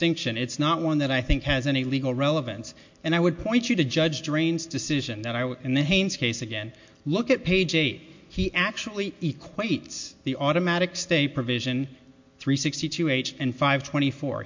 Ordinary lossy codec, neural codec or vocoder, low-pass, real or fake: MP3, 48 kbps; none; 7.2 kHz; real